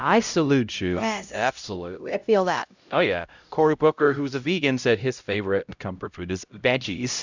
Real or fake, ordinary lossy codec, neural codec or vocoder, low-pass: fake; Opus, 64 kbps; codec, 16 kHz, 0.5 kbps, X-Codec, HuBERT features, trained on LibriSpeech; 7.2 kHz